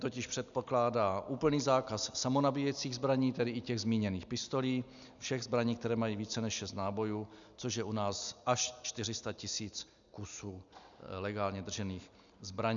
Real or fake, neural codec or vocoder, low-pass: real; none; 7.2 kHz